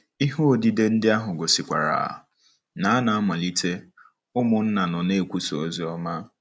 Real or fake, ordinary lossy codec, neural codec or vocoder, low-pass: real; none; none; none